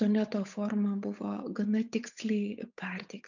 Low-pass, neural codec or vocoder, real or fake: 7.2 kHz; codec, 16 kHz, 8 kbps, FunCodec, trained on Chinese and English, 25 frames a second; fake